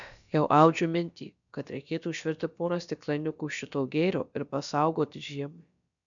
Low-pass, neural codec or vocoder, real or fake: 7.2 kHz; codec, 16 kHz, about 1 kbps, DyCAST, with the encoder's durations; fake